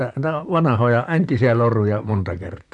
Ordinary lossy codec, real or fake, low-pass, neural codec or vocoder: none; fake; 10.8 kHz; vocoder, 44.1 kHz, 128 mel bands, Pupu-Vocoder